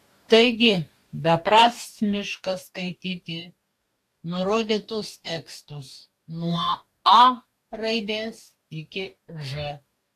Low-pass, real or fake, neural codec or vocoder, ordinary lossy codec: 14.4 kHz; fake; codec, 44.1 kHz, 2.6 kbps, DAC; AAC, 64 kbps